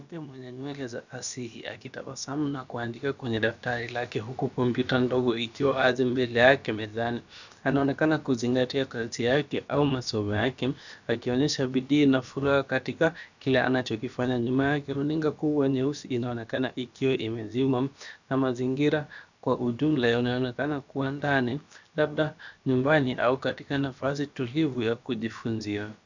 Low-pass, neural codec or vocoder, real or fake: 7.2 kHz; codec, 16 kHz, about 1 kbps, DyCAST, with the encoder's durations; fake